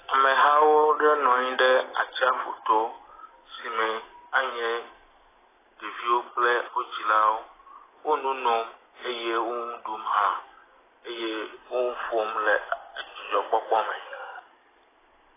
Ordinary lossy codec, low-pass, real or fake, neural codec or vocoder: AAC, 16 kbps; 3.6 kHz; real; none